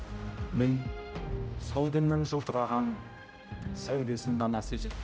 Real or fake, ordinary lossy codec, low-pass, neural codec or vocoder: fake; none; none; codec, 16 kHz, 0.5 kbps, X-Codec, HuBERT features, trained on general audio